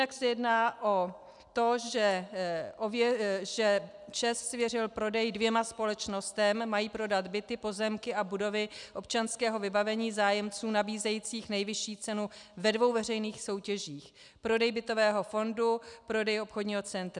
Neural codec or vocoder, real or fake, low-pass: none; real; 10.8 kHz